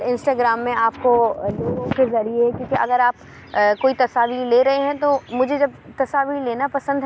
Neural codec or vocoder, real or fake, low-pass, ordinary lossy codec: none; real; none; none